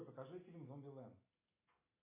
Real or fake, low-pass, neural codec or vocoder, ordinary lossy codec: real; 3.6 kHz; none; MP3, 24 kbps